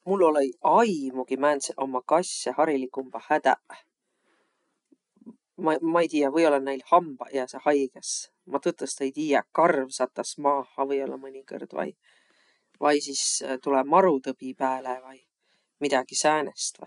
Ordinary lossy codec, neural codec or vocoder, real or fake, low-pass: none; none; real; 10.8 kHz